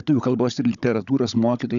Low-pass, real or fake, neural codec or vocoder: 7.2 kHz; fake; codec, 16 kHz, 16 kbps, FunCodec, trained on Chinese and English, 50 frames a second